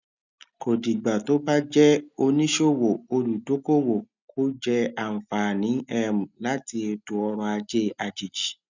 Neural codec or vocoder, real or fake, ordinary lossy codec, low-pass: none; real; none; 7.2 kHz